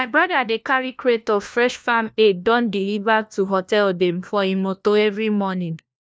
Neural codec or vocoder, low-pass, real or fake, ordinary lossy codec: codec, 16 kHz, 1 kbps, FunCodec, trained on LibriTTS, 50 frames a second; none; fake; none